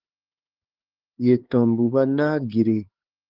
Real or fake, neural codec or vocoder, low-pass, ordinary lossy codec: fake; codec, 16 kHz, 4 kbps, X-Codec, HuBERT features, trained on LibriSpeech; 5.4 kHz; Opus, 16 kbps